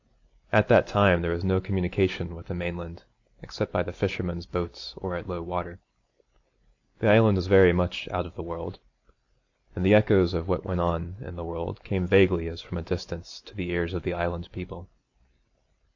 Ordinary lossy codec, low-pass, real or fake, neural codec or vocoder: AAC, 48 kbps; 7.2 kHz; real; none